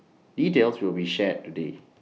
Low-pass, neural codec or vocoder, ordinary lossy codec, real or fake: none; none; none; real